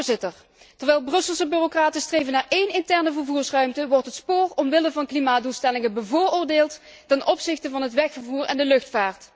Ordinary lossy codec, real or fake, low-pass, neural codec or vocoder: none; real; none; none